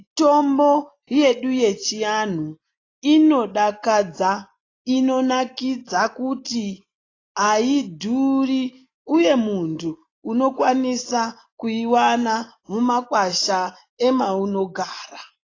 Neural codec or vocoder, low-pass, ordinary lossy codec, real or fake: none; 7.2 kHz; AAC, 32 kbps; real